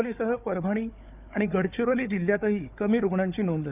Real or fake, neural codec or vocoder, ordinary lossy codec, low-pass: fake; codec, 16 kHz, 4 kbps, FunCodec, trained on Chinese and English, 50 frames a second; none; 3.6 kHz